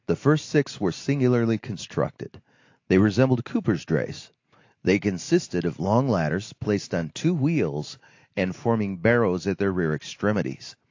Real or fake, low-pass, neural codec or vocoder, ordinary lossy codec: real; 7.2 kHz; none; AAC, 48 kbps